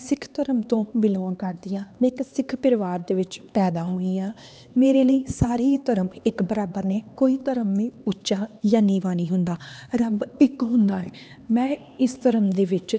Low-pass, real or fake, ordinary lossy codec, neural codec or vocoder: none; fake; none; codec, 16 kHz, 2 kbps, X-Codec, HuBERT features, trained on LibriSpeech